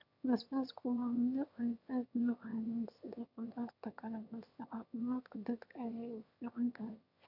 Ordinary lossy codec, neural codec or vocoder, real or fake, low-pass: none; autoencoder, 22.05 kHz, a latent of 192 numbers a frame, VITS, trained on one speaker; fake; 5.4 kHz